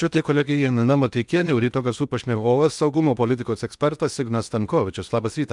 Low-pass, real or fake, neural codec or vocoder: 10.8 kHz; fake; codec, 16 kHz in and 24 kHz out, 0.8 kbps, FocalCodec, streaming, 65536 codes